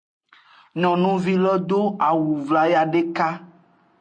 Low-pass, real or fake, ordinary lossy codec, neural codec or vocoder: 9.9 kHz; real; MP3, 48 kbps; none